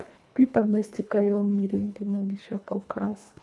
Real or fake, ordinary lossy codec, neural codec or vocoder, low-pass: fake; none; codec, 24 kHz, 1.5 kbps, HILCodec; none